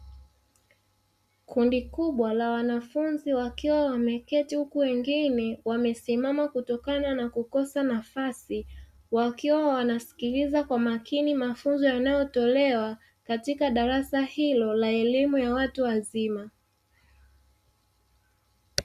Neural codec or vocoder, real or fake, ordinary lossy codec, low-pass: none; real; Opus, 64 kbps; 14.4 kHz